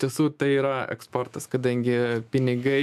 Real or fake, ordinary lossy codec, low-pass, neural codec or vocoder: fake; AAC, 96 kbps; 14.4 kHz; autoencoder, 48 kHz, 128 numbers a frame, DAC-VAE, trained on Japanese speech